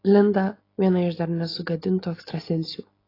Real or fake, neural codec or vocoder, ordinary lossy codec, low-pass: real; none; AAC, 24 kbps; 5.4 kHz